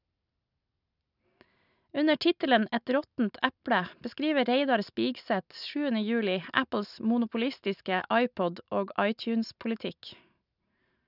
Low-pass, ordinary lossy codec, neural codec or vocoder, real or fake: 5.4 kHz; none; none; real